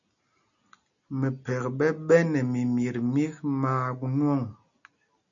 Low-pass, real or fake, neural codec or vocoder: 7.2 kHz; real; none